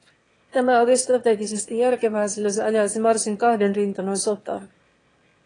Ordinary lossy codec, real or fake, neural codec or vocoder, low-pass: AAC, 32 kbps; fake; autoencoder, 22.05 kHz, a latent of 192 numbers a frame, VITS, trained on one speaker; 9.9 kHz